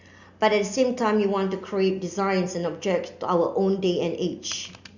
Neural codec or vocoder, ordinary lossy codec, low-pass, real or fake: none; Opus, 64 kbps; 7.2 kHz; real